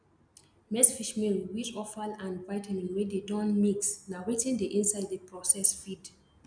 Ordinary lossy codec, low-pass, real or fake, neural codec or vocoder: none; 9.9 kHz; real; none